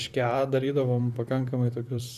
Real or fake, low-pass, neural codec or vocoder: fake; 14.4 kHz; vocoder, 44.1 kHz, 128 mel bands, Pupu-Vocoder